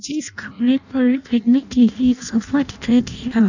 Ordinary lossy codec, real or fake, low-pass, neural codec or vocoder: none; fake; 7.2 kHz; codec, 16 kHz in and 24 kHz out, 0.6 kbps, FireRedTTS-2 codec